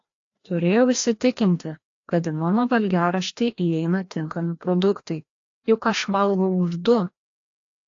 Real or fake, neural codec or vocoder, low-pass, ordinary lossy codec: fake; codec, 16 kHz, 1 kbps, FreqCodec, larger model; 7.2 kHz; AAC, 48 kbps